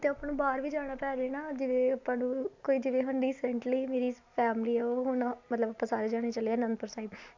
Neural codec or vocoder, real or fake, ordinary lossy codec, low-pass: none; real; MP3, 64 kbps; 7.2 kHz